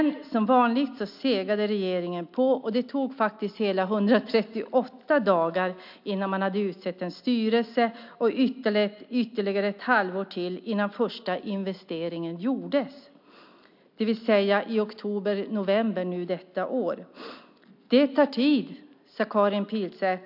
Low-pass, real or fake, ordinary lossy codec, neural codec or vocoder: 5.4 kHz; real; MP3, 48 kbps; none